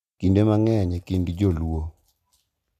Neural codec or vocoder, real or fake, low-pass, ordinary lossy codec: none; real; 14.4 kHz; none